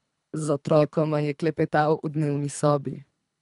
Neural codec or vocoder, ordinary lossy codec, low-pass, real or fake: codec, 24 kHz, 3 kbps, HILCodec; none; 10.8 kHz; fake